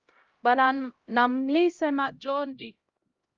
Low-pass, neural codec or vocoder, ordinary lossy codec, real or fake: 7.2 kHz; codec, 16 kHz, 0.5 kbps, X-Codec, HuBERT features, trained on LibriSpeech; Opus, 32 kbps; fake